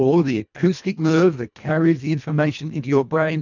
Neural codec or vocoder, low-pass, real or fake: codec, 24 kHz, 1.5 kbps, HILCodec; 7.2 kHz; fake